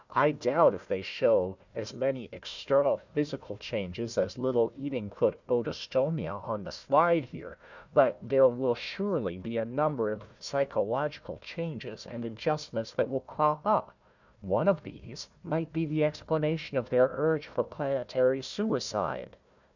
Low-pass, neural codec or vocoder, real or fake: 7.2 kHz; codec, 16 kHz, 1 kbps, FunCodec, trained on Chinese and English, 50 frames a second; fake